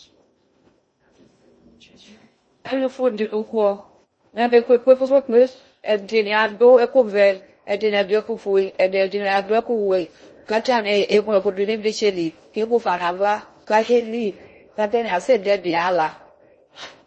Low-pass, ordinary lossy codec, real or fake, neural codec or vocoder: 9.9 kHz; MP3, 32 kbps; fake; codec, 16 kHz in and 24 kHz out, 0.6 kbps, FocalCodec, streaming, 2048 codes